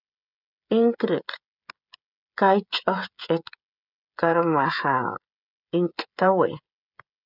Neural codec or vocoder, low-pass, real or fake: codec, 16 kHz, 16 kbps, FreqCodec, smaller model; 5.4 kHz; fake